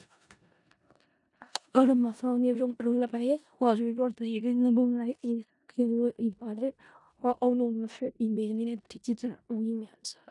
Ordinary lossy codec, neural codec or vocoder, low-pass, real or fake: none; codec, 16 kHz in and 24 kHz out, 0.4 kbps, LongCat-Audio-Codec, four codebook decoder; 10.8 kHz; fake